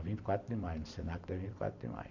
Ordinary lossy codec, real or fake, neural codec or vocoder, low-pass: AAC, 48 kbps; fake; vocoder, 44.1 kHz, 128 mel bands every 256 samples, BigVGAN v2; 7.2 kHz